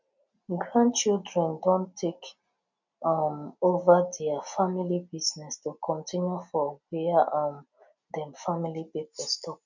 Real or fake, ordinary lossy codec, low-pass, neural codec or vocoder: real; none; 7.2 kHz; none